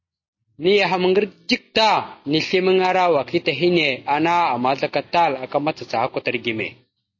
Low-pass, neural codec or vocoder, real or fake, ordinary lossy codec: 7.2 kHz; none; real; MP3, 32 kbps